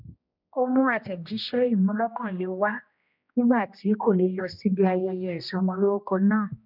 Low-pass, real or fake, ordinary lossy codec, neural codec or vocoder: 5.4 kHz; fake; none; codec, 16 kHz, 1 kbps, X-Codec, HuBERT features, trained on general audio